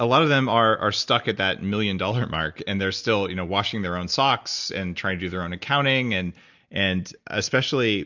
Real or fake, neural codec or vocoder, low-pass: real; none; 7.2 kHz